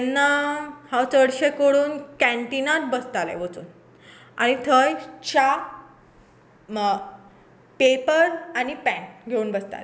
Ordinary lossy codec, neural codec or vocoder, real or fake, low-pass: none; none; real; none